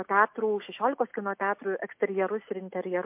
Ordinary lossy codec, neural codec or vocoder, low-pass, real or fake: MP3, 32 kbps; none; 3.6 kHz; real